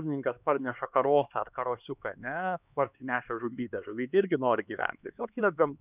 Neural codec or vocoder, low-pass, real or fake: codec, 16 kHz, 2 kbps, X-Codec, HuBERT features, trained on LibriSpeech; 3.6 kHz; fake